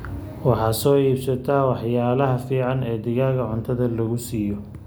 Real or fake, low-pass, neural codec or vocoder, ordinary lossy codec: real; none; none; none